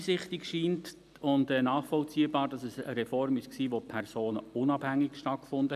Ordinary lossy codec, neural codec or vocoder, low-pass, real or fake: none; none; 14.4 kHz; real